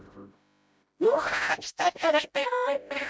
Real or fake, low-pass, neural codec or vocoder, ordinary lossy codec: fake; none; codec, 16 kHz, 0.5 kbps, FreqCodec, smaller model; none